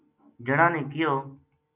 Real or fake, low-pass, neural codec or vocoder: real; 3.6 kHz; none